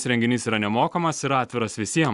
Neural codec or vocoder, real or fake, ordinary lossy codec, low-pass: none; real; Opus, 64 kbps; 10.8 kHz